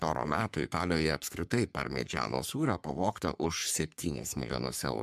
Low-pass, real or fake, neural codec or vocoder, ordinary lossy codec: 14.4 kHz; fake; codec, 44.1 kHz, 3.4 kbps, Pupu-Codec; MP3, 96 kbps